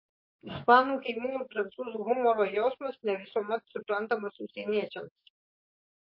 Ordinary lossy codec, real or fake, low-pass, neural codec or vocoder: MP3, 32 kbps; fake; 5.4 kHz; codec, 44.1 kHz, 7.8 kbps, DAC